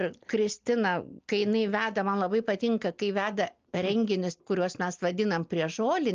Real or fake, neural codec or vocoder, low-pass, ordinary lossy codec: real; none; 7.2 kHz; Opus, 24 kbps